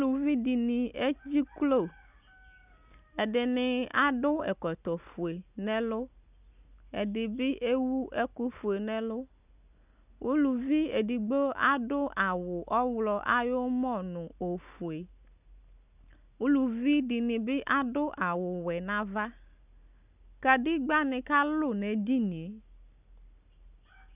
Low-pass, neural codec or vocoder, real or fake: 3.6 kHz; none; real